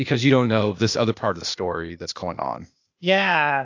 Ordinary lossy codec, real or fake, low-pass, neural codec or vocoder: AAC, 48 kbps; fake; 7.2 kHz; codec, 16 kHz, 0.8 kbps, ZipCodec